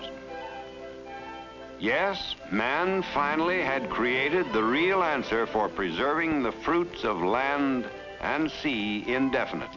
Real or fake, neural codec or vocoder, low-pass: real; none; 7.2 kHz